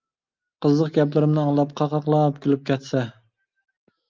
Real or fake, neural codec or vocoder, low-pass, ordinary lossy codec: real; none; 7.2 kHz; Opus, 24 kbps